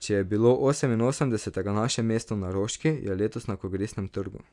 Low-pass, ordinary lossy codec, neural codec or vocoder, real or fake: 10.8 kHz; none; none; real